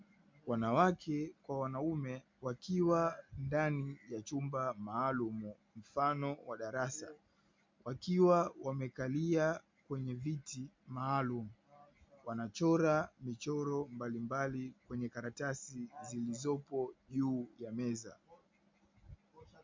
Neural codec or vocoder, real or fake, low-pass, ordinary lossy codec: none; real; 7.2 kHz; MP3, 64 kbps